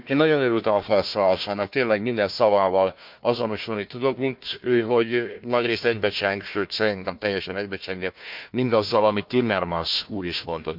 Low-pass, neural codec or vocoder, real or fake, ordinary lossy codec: 5.4 kHz; codec, 16 kHz, 1 kbps, FunCodec, trained on Chinese and English, 50 frames a second; fake; MP3, 48 kbps